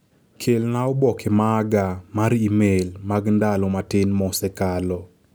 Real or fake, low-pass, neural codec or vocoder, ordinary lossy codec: real; none; none; none